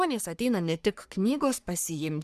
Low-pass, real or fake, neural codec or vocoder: 14.4 kHz; fake; codec, 44.1 kHz, 3.4 kbps, Pupu-Codec